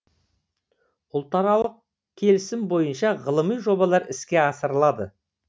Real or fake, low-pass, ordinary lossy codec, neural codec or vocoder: real; none; none; none